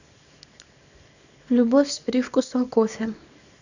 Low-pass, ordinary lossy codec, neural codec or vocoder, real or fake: 7.2 kHz; none; codec, 24 kHz, 0.9 kbps, WavTokenizer, small release; fake